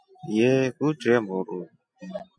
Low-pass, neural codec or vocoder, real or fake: 9.9 kHz; none; real